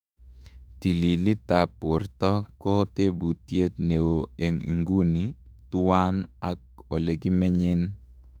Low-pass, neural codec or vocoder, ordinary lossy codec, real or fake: 19.8 kHz; autoencoder, 48 kHz, 32 numbers a frame, DAC-VAE, trained on Japanese speech; none; fake